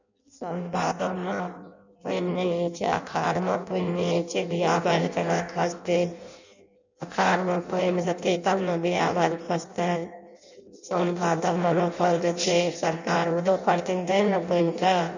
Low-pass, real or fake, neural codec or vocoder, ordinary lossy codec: 7.2 kHz; fake; codec, 16 kHz in and 24 kHz out, 0.6 kbps, FireRedTTS-2 codec; none